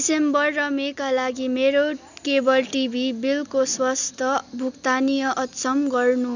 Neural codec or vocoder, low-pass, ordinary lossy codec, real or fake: none; 7.2 kHz; none; real